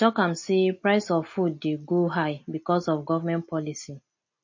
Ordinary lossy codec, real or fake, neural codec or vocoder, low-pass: MP3, 32 kbps; real; none; 7.2 kHz